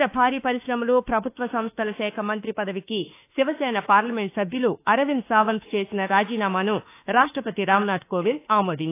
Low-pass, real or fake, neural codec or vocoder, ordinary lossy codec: 3.6 kHz; fake; autoencoder, 48 kHz, 32 numbers a frame, DAC-VAE, trained on Japanese speech; AAC, 24 kbps